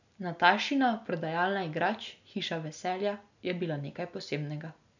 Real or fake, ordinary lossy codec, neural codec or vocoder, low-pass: real; none; none; 7.2 kHz